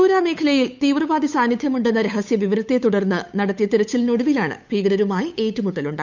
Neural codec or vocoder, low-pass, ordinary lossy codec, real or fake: codec, 16 kHz, 8 kbps, FunCodec, trained on Chinese and English, 25 frames a second; 7.2 kHz; none; fake